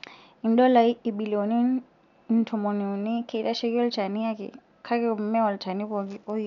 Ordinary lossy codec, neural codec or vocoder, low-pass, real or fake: none; none; 7.2 kHz; real